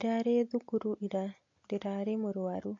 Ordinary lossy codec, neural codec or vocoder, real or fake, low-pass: AAC, 48 kbps; none; real; 7.2 kHz